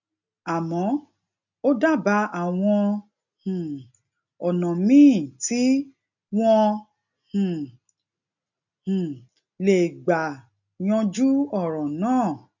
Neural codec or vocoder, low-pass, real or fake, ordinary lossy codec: none; 7.2 kHz; real; none